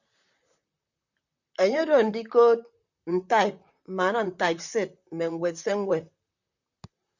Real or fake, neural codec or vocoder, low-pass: fake; vocoder, 44.1 kHz, 128 mel bands, Pupu-Vocoder; 7.2 kHz